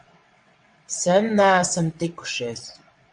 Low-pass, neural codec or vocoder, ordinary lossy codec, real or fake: 9.9 kHz; vocoder, 22.05 kHz, 80 mel bands, WaveNeXt; MP3, 64 kbps; fake